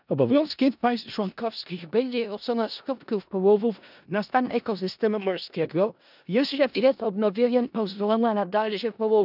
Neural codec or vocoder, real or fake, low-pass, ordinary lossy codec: codec, 16 kHz in and 24 kHz out, 0.4 kbps, LongCat-Audio-Codec, four codebook decoder; fake; 5.4 kHz; none